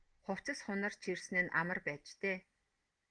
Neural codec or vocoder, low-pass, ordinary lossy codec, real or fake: none; 9.9 kHz; Opus, 24 kbps; real